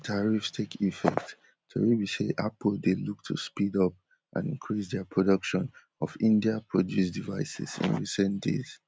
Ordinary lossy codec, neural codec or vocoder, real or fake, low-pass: none; none; real; none